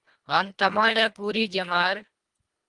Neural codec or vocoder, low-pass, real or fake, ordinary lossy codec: codec, 24 kHz, 1.5 kbps, HILCodec; 10.8 kHz; fake; Opus, 32 kbps